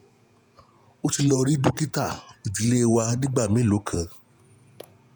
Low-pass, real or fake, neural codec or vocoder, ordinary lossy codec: none; fake; vocoder, 48 kHz, 128 mel bands, Vocos; none